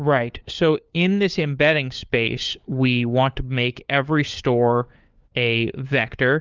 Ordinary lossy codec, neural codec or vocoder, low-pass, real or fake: Opus, 24 kbps; codec, 16 kHz, 4 kbps, FunCodec, trained on LibriTTS, 50 frames a second; 7.2 kHz; fake